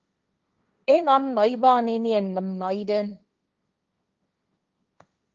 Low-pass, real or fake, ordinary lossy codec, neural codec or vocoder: 7.2 kHz; fake; Opus, 24 kbps; codec, 16 kHz, 1.1 kbps, Voila-Tokenizer